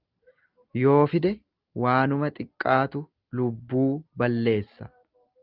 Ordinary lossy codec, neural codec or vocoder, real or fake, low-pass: Opus, 24 kbps; none; real; 5.4 kHz